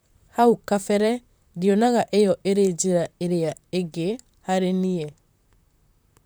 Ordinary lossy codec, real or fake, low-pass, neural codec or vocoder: none; fake; none; vocoder, 44.1 kHz, 128 mel bands, Pupu-Vocoder